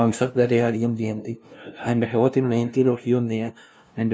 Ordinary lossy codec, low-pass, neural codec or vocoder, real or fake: none; none; codec, 16 kHz, 0.5 kbps, FunCodec, trained on LibriTTS, 25 frames a second; fake